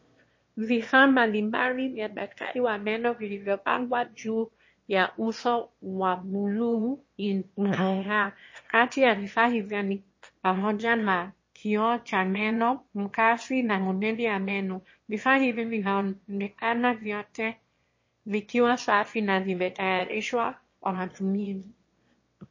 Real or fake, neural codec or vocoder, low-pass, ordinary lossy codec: fake; autoencoder, 22.05 kHz, a latent of 192 numbers a frame, VITS, trained on one speaker; 7.2 kHz; MP3, 32 kbps